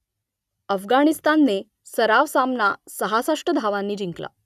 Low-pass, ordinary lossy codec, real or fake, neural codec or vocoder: 14.4 kHz; none; real; none